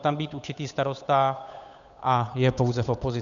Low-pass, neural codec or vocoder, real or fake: 7.2 kHz; codec, 16 kHz, 8 kbps, FunCodec, trained on Chinese and English, 25 frames a second; fake